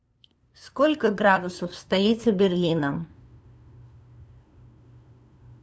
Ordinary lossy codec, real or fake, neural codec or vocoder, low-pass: none; fake; codec, 16 kHz, 2 kbps, FunCodec, trained on LibriTTS, 25 frames a second; none